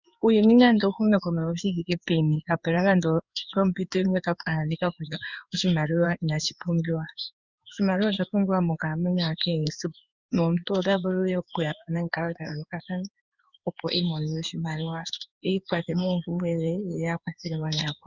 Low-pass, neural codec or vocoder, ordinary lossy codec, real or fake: 7.2 kHz; codec, 16 kHz in and 24 kHz out, 1 kbps, XY-Tokenizer; Opus, 64 kbps; fake